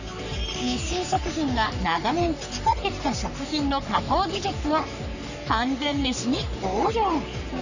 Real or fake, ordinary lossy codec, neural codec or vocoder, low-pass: fake; none; codec, 44.1 kHz, 3.4 kbps, Pupu-Codec; 7.2 kHz